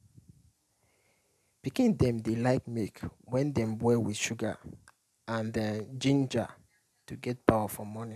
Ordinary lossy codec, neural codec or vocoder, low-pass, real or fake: none; none; 14.4 kHz; real